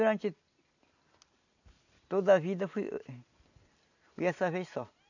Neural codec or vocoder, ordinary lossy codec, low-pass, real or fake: none; none; 7.2 kHz; real